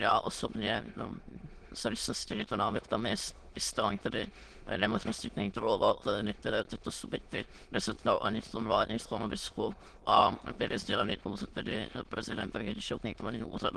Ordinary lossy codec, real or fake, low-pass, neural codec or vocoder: Opus, 16 kbps; fake; 9.9 kHz; autoencoder, 22.05 kHz, a latent of 192 numbers a frame, VITS, trained on many speakers